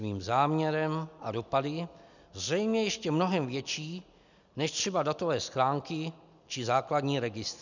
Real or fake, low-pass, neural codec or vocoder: real; 7.2 kHz; none